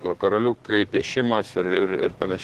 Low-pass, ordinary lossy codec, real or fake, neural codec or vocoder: 14.4 kHz; Opus, 16 kbps; fake; codec, 32 kHz, 1.9 kbps, SNAC